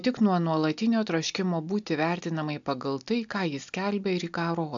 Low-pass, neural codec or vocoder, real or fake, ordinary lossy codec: 7.2 kHz; none; real; AAC, 64 kbps